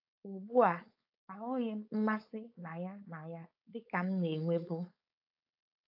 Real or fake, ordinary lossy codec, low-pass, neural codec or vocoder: fake; AAC, 48 kbps; 5.4 kHz; codec, 16 kHz, 4.8 kbps, FACodec